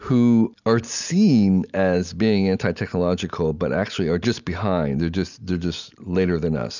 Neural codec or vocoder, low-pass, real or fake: none; 7.2 kHz; real